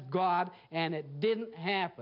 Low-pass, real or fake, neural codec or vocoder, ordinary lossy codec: 5.4 kHz; real; none; MP3, 48 kbps